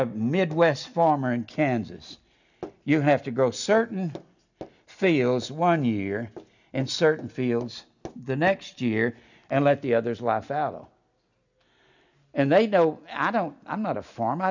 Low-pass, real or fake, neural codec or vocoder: 7.2 kHz; real; none